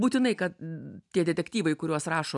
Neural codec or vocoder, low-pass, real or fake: none; 10.8 kHz; real